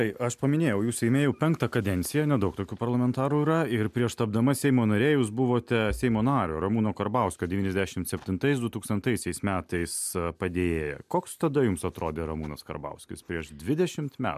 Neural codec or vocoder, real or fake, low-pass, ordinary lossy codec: none; real; 14.4 kHz; MP3, 96 kbps